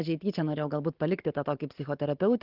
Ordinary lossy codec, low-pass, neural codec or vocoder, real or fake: Opus, 16 kbps; 5.4 kHz; none; real